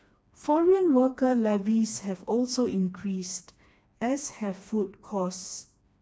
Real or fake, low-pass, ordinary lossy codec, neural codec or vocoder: fake; none; none; codec, 16 kHz, 2 kbps, FreqCodec, smaller model